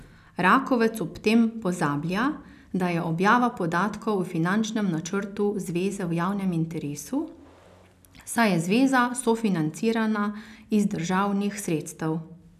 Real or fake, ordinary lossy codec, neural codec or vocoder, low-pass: real; none; none; 14.4 kHz